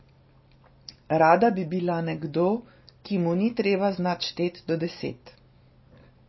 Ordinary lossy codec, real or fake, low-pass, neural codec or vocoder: MP3, 24 kbps; real; 7.2 kHz; none